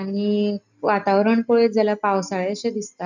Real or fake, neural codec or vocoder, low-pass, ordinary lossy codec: real; none; 7.2 kHz; none